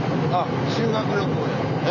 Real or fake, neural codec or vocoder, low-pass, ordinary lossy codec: real; none; 7.2 kHz; none